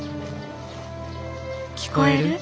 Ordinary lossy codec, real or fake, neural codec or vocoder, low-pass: none; real; none; none